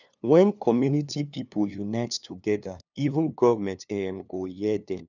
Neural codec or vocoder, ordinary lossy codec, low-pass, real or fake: codec, 16 kHz, 2 kbps, FunCodec, trained on LibriTTS, 25 frames a second; none; 7.2 kHz; fake